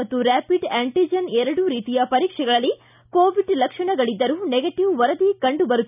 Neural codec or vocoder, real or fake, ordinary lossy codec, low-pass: none; real; none; 3.6 kHz